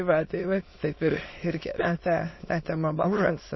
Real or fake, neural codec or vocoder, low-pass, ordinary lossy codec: fake; autoencoder, 22.05 kHz, a latent of 192 numbers a frame, VITS, trained on many speakers; 7.2 kHz; MP3, 24 kbps